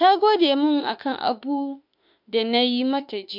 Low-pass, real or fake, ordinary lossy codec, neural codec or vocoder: 5.4 kHz; fake; MP3, 48 kbps; autoencoder, 48 kHz, 32 numbers a frame, DAC-VAE, trained on Japanese speech